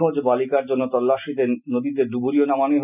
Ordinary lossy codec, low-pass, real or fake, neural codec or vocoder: none; 3.6 kHz; real; none